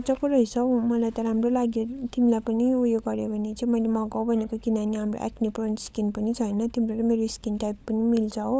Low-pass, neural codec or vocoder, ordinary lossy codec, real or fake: none; codec, 16 kHz, 16 kbps, FunCodec, trained on LibriTTS, 50 frames a second; none; fake